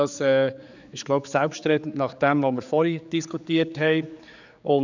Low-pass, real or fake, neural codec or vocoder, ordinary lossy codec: 7.2 kHz; fake; codec, 16 kHz, 4 kbps, X-Codec, HuBERT features, trained on general audio; none